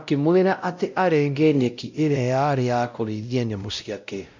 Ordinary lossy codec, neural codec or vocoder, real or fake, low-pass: MP3, 64 kbps; codec, 16 kHz, 0.5 kbps, X-Codec, WavLM features, trained on Multilingual LibriSpeech; fake; 7.2 kHz